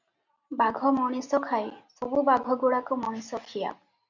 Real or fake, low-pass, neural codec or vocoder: real; 7.2 kHz; none